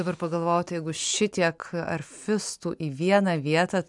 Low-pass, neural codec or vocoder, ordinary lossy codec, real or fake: 10.8 kHz; none; MP3, 96 kbps; real